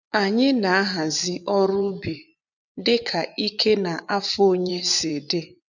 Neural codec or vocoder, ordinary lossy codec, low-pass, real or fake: vocoder, 24 kHz, 100 mel bands, Vocos; none; 7.2 kHz; fake